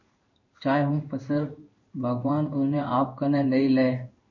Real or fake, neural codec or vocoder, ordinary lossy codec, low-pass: fake; codec, 16 kHz in and 24 kHz out, 1 kbps, XY-Tokenizer; MP3, 48 kbps; 7.2 kHz